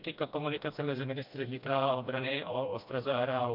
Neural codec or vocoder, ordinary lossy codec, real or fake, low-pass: codec, 16 kHz, 1 kbps, FreqCodec, smaller model; MP3, 48 kbps; fake; 5.4 kHz